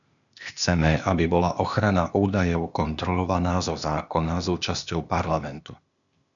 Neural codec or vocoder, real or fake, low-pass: codec, 16 kHz, 0.8 kbps, ZipCodec; fake; 7.2 kHz